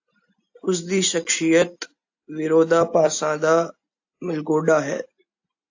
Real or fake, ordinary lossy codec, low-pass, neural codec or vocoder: real; AAC, 48 kbps; 7.2 kHz; none